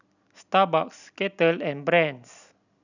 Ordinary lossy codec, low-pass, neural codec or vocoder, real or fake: none; 7.2 kHz; none; real